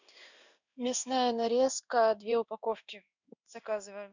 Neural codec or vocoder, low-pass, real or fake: codec, 16 kHz in and 24 kHz out, 1 kbps, XY-Tokenizer; 7.2 kHz; fake